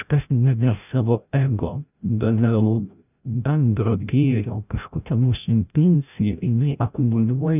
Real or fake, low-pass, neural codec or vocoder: fake; 3.6 kHz; codec, 16 kHz, 0.5 kbps, FreqCodec, larger model